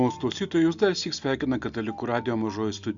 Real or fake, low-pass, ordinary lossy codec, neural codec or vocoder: real; 7.2 kHz; Opus, 64 kbps; none